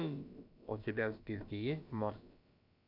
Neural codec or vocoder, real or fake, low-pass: codec, 16 kHz, about 1 kbps, DyCAST, with the encoder's durations; fake; 5.4 kHz